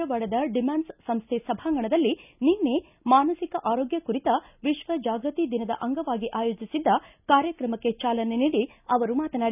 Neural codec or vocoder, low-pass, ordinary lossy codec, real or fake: none; 3.6 kHz; none; real